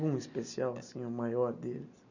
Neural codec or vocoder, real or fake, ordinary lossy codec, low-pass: none; real; none; 7.2 kHz